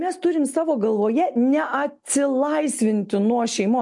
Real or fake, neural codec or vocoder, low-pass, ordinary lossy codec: real; none; 10.8 kHz; MP3, 64 kbps